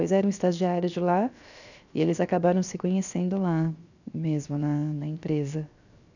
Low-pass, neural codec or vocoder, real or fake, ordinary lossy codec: 7.2 kHz; codec, 16 kHz, 0.7 kbps, FocalCodec; fake; none